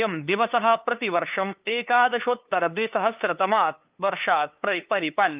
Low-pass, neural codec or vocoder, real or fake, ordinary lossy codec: 3.6 kHz; codec, 16 kHz, 2 kbps, FunCodec, trained on LibriTTS, 25 frames a second; fake; Opus, 24 kbps